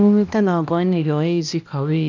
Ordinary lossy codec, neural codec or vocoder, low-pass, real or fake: none; codec, 16 kHz, 1 kbps, X-Codec, HuBERT features, trained on balanced general audio; 7.2 kHz; fake